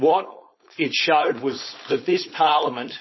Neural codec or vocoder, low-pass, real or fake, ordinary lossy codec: codec, 16 kHz, 4.8 kbps, FACodec; 7.2 kHz; fake; MP3, 24 kbps